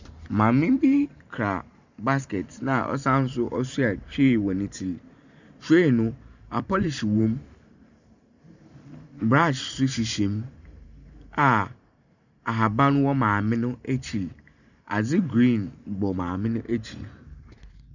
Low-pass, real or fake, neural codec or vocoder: 7.2 kHz; fake; vocoder, 44.1 kHz, 128 mel bands every 512 samples, BigVGAN v2